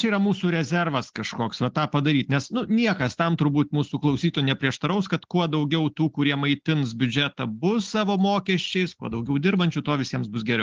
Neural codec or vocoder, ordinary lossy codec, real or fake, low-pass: none; Opus, 16 kbps; real; 7.2 kHz